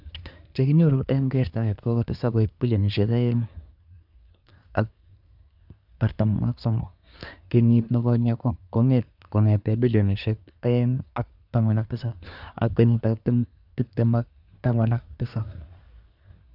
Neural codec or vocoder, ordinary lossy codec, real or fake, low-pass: codec, 24 kHz, 1 kbps, SNAC; none; fake; 5.4 kHz